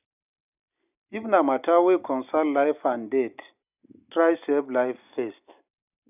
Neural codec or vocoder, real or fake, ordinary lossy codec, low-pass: none; real; none; 3.6 kHz